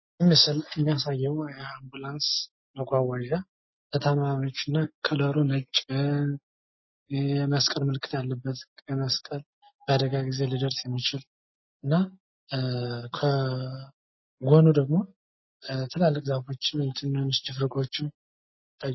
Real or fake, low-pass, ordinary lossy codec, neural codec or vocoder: real; 7.2 kHz; MP3, 24 kbps; none